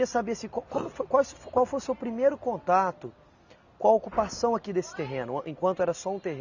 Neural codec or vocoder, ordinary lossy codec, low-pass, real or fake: none; none; 7.2 kHz; real